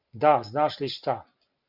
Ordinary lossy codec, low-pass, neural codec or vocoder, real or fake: Opus, 64 kbps; 5.4 kHz; none; real